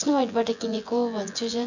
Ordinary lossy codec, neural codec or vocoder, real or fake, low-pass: none; vocoder, 24 kHz, 100 mel bands, Vocos; fake; 7.2 kHz